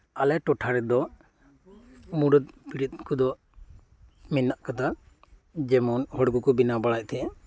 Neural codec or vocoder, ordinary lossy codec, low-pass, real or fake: none; none; none; real